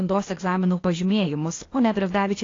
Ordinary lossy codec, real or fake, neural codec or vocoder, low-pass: AAC, 32 kbps; fake; codec, 16 kHz, 0.8 kbps, ZipCodec; 7.2 kHz